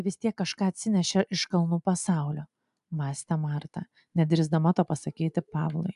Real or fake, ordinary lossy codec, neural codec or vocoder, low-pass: real; AAC, 96 kbps; none; 10.8 kHz